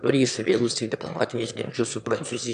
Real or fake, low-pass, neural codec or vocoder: fake; 9.9 kHz; autoencoder, 22.05 kHz, a latent of 192 numbers a frame, VITS, trained on one speaker